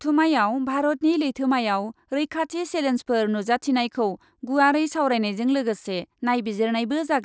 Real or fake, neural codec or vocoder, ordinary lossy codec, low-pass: real; none; none; none